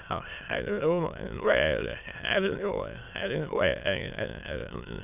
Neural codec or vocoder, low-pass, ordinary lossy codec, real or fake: autoencoder, 22.05 kHz, a latent of 192 numbers a frame, VITS, trained on many speakers; 3.6 kHz; none; fake